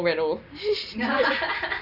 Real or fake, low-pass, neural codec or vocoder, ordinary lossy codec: real; 5.4 kHz; none; none